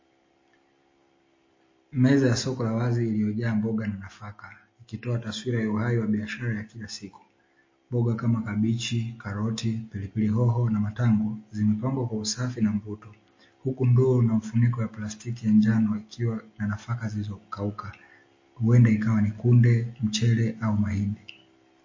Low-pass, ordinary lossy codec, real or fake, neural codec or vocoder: 7.2 kHz; MP3, 32 kbps; real; none